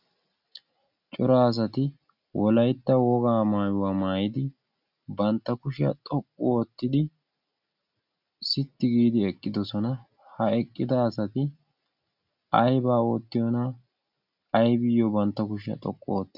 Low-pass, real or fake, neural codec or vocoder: 5.4 kHz; real; none